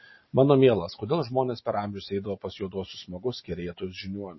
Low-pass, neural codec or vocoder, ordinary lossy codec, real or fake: 7.2 kHz; none; MP3, 24 kbps; real